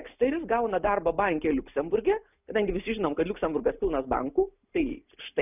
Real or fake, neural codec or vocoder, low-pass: real; none; 3.6 kHz